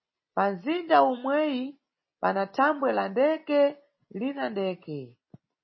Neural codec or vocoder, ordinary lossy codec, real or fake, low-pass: none; MP3, 24 kbps; real; 7.2 kHz